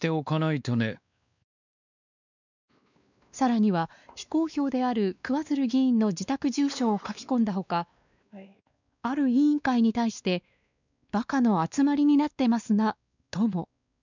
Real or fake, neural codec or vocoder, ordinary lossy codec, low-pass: fake; codec, 16 kHz, 2 kbps, X-Codec, WavLM features, trained on Multilingual LibriSpeech; none; 7.2 kHz